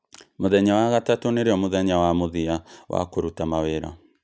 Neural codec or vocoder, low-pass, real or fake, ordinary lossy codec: none; none; real; none